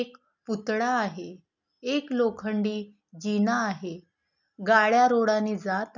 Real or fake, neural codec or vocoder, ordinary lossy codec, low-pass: real; none; none; 7.2 kHz